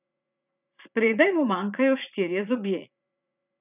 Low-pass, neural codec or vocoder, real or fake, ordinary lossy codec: 3.6 kHz; vocoder, 44.1 kHz, 80 mel bands, Vocos; fake; none